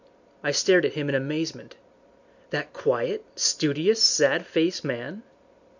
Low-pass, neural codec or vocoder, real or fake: 7.2 kHz; none; real